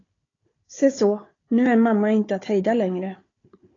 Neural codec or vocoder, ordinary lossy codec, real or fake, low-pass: codec, 16 kHz, 4 kbps, FunCodec, trained on Chinese and English, 50 frames a second; AAC, 32 kbps; fake; 7.2 kHz